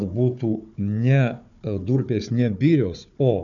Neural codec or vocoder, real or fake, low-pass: codec, 16 kHz, 4 kbps, FunCodec, trained on Chinese and English, 50 frames a second; fake; 7.2 kHz